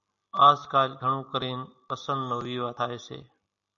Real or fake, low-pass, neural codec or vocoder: real; 7.2 kHz; none